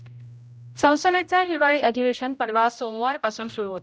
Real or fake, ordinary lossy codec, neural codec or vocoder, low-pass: fake; none; codec, 16 kHz, 0.5 kbps, X-Codec, HuBERT features, trained on general audio; none